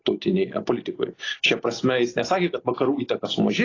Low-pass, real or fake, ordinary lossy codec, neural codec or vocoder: 7.2 kHz; real; AAC, 32 kbps; none